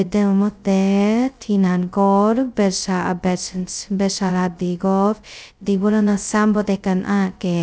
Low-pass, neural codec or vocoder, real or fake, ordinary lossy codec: none; codec, 16 kHz, 0.2 kbps, FocalCodec; fake; none